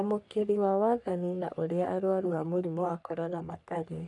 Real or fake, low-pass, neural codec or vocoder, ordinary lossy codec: fake; 10.8 kHz; codec, 44.1 kHz, 3.4 kbps, Pupu-Codec; none